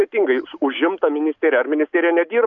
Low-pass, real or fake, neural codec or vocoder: 7.2 kHz; real; none